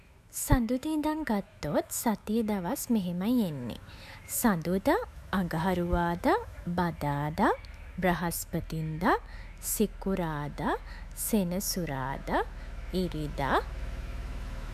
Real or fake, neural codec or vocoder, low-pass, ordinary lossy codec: fake; autoencoder, 48 kHz, 128 numbers a frame, DAC-VAE, trained on Japanese speech; 14.4 kHz; none